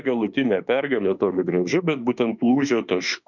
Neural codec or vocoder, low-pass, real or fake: autoencoder, 48 kHz, 32 numbers a frame, DAC-VAE, trained on Japanese speech; 7.2 kHz; fake